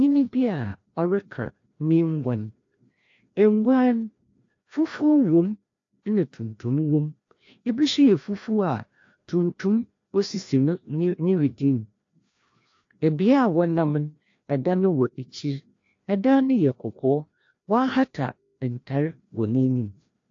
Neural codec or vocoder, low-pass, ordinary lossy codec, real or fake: codec, 16 kHz, 1 kbps, FreqCodec, larger model; 7.2 kHz; AAC, 48 kbps; fake